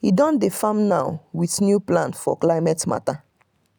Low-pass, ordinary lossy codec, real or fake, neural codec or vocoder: none; none; real; none